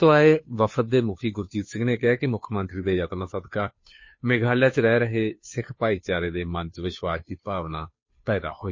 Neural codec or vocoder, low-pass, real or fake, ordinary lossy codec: codec, 16 kHz, 2 kbps, FunCodec, trained on Chinese and English, 25 frames a second; 7.2 kHz; fake; MP3, 32 kbps